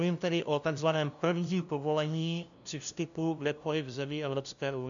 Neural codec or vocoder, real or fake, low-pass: codec, 16 kHz, 0.5 kbps, FunCodec, trained on LibriTTS, 25 frames a second; fake; 7.2 kHz